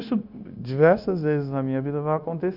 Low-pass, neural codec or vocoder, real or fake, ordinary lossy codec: 5.4 kHz; codec, 16 kHz, 0.9 kbps, LongCat-Audio-Codec; fake; none